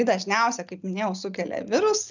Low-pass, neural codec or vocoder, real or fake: 7.2 kHz; none; real